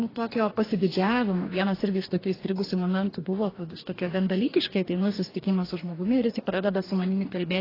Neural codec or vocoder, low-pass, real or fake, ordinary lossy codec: codec, 44.1 kHz, 2.6 kbps, DAC; 5.4 kHz; fake; AAC, 24 kbps